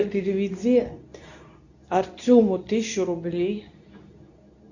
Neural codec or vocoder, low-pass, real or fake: codec, 24 kHz, 0.9 kbps, WavTokenizer, medium speech release version 2; 7.2 kHz; fake